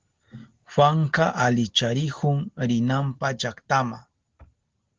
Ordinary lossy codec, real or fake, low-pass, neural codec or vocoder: Opus, 16 kbps; real; 7.2 kHz; none